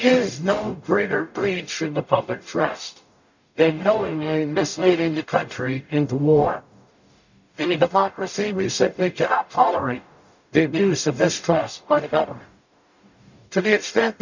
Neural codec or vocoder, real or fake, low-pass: codec, 44.1 kHz, 0.9 kbps, DAC; fake; 7.2 kHz